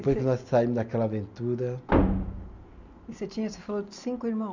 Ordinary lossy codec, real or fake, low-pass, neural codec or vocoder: none; real; 7.2 kHz; none